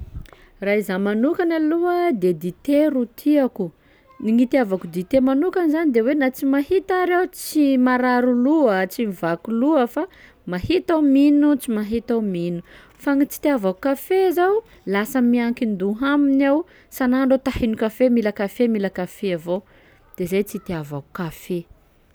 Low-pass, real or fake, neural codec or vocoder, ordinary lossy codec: none; real; none; none